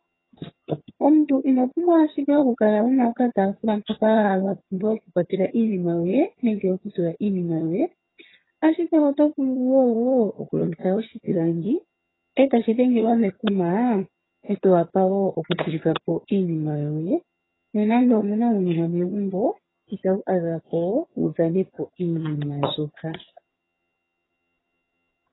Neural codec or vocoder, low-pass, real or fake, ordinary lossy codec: vocoder, 22.05 kHz, 80 mel bands, HiFi-GAN; 7.2 kHz; fake; AAC, 16 kbps